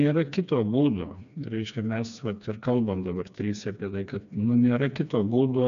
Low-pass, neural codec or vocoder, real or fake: 7.2 kHz; codec, 16 kHz, 2 kbps, FreqCodec, smaller model; fake